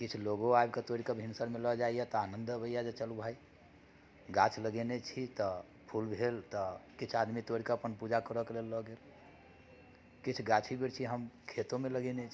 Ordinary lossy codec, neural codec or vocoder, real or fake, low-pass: none; none; real; none